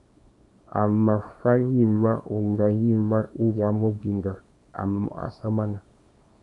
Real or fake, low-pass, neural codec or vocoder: fake; 10.8 kHz; codec, 24 kHz, 0.9 kbps, WavTokenizer, small release